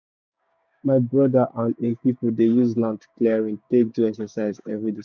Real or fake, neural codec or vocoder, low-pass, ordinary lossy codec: fake; codec, 16 kHz, 6 kbps, DAC; none; none